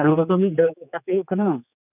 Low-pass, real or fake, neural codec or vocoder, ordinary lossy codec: 3.6 kHz; fake; codec, 16 kHz, 4 kbps, X-Codec, HuBERT features, trained on general audio; none